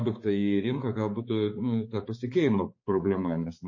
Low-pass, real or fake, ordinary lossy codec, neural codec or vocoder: 7.2 kHz; fake; MP3, 32 kbps; codec, 16 kHz, 4 kbps, X-Codec, HuBERT features, trained on balanced general audio